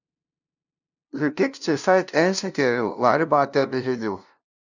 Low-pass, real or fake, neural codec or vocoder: 7.2 kHz; fake; codec, 16 kHz, 0.5 kbps, FunCodec, trained on LibriTTS, 25 frames a second